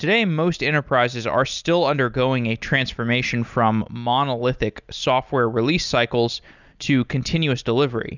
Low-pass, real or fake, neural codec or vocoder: 7.2 kHz; real; none